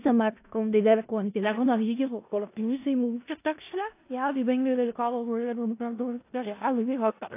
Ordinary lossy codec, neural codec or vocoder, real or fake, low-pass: AAC, 24 kbps; codec, 16 kHz in and 24 kHz out, 0.4 kbps, LongCat-Audio-Codec, four codebook decoder; fake; 3.6 kHz